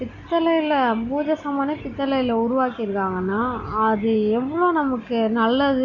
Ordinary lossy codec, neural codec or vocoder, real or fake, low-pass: none; none; real; 7.2 kHz